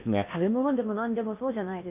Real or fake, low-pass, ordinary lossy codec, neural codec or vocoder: fake; 3.6 kHz; AAC, 32 kbps; codec, 16 kHz in and 24 kHz out, 0.8 kbps, FocalCodec, streaming, 65536 codes